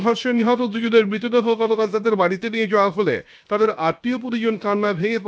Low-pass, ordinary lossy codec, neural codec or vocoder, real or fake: none; none; codec, 16 kHz, 0.7 kbps, FocalCodec; fake